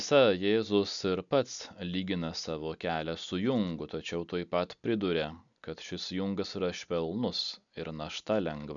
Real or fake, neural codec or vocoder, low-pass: real; none; 7.2 kHz